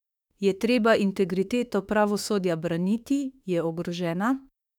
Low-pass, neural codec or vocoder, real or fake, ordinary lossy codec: 19.8 kHz; autoencoder, 48 kHz, 32 numbers a frame, DAC-VAE, trained on Japanese speech; fake; none